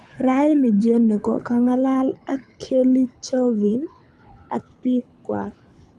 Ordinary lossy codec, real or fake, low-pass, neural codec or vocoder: none; fake; none; codec, 24 kHz, 6 kbps, HILCodec